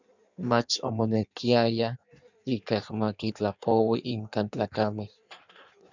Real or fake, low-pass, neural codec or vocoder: fake; 7.2 kHz; codec, 16 kHz in and 24 kHz out, 1.1 kbps, FireRedTTS-2 codec